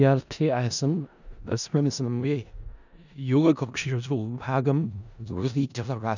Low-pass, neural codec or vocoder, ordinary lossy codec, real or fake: 7.2 kHz; codec, 16 kHz in and 24 kHz out, 0.4 kbps, LongCat-Audio-Codec, four codebook decoder; none; fake